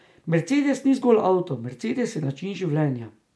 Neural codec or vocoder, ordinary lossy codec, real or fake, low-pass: none; none; real; none